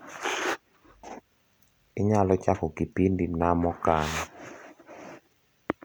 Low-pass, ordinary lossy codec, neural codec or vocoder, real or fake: none; none; none; real